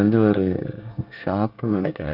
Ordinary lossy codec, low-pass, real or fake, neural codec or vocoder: none; 5.4 kHz; fake; codec, 24 kHz, 1 kbps, SNAC